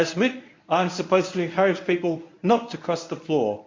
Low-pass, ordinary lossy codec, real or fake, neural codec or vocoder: 7.2 kHz; MP3, 48 kbps; fake; codec, 24 kHz, 0.9 kbps, WavTokenizer, medium speech release version 1